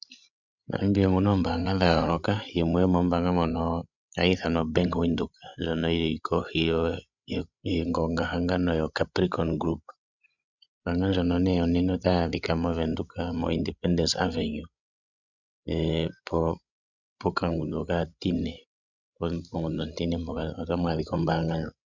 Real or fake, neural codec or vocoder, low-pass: fake; codec, 16 kHz, 16 kbps, FreqCodec, larger model; 7.2 kHz